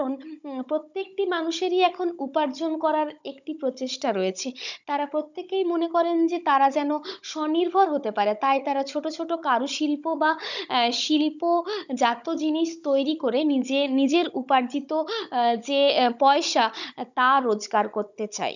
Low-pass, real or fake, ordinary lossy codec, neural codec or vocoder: 7.2 kHz; fake; none; codec, 16 kHz, 16 kbps, FunCodec, trained on Chinese and English, 50 frames a second